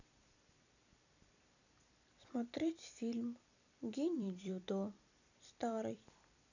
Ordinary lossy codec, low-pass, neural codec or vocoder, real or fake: none; 7.2 kHz; vocoder, 44.1 kHz, 128 mel bands every 256 samples, BigVGAN v2; fake